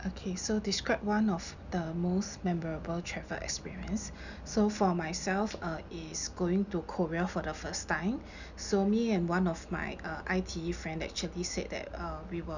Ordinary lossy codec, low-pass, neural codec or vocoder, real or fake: none; 7.2 kHz; none; real